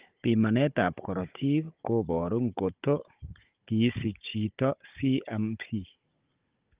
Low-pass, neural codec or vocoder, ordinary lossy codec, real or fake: 3.6 kHz; codec, 16 kHz, 16 kbps, FunCodec, trained on Chinese and English, 50 frames a second; Opus, 32 kbps; fake